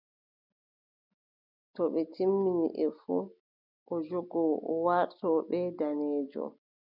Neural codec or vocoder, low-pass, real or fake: none; 5.4 kHz; real